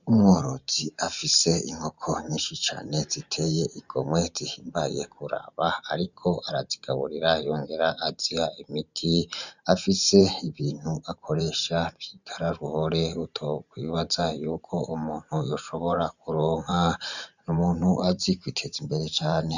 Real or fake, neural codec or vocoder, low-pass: fake; vocoder, 44.1 kHz, 128 mel bands every 512 samples, BigVGAN v2; 7.2 kHz